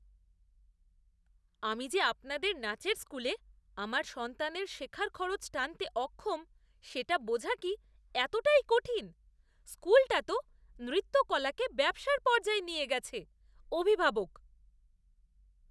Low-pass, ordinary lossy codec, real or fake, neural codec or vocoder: none; none; real; none